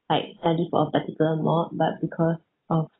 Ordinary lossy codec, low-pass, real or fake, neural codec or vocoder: AAC, 16 kbps; 7.2 kHz; real; none